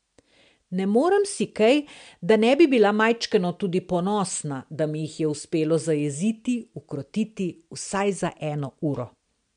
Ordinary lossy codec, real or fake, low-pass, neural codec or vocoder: MP3, 64 kbps; real; 9.9 kHz; none